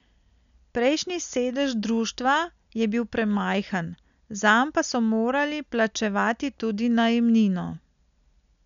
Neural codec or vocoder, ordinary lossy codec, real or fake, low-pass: none; none; real; 7.2 kHz